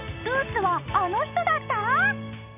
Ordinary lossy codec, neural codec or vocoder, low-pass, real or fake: none; none; 3.6 kHz; real